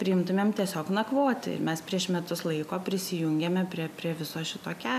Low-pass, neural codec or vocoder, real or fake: 14.4 kHz; none; real